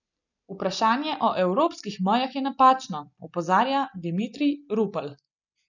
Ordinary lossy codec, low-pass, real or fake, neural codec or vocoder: none; 7.2 kHz; real; none